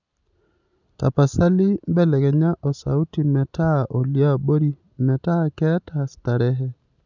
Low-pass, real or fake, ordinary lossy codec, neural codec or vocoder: 7.2 kHz; real; none; none